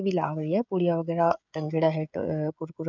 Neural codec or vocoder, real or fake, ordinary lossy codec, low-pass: codec, 16 kHz, 16 kbps, FreqCodec, smaller model; fake; none; 7.2 kHz